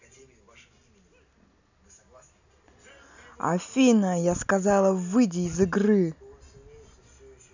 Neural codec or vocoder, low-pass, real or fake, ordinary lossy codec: none; 7.2 kHz; real; none